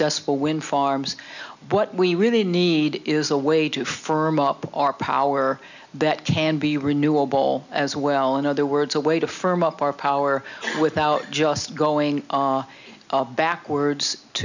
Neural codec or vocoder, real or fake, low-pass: none; real; 7.2 kHz